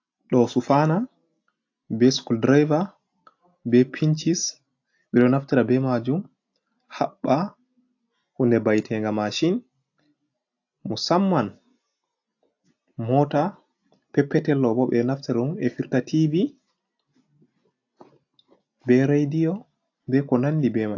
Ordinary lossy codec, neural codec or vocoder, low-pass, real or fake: AAC, 48 kbps; none; 7.2 kHz; real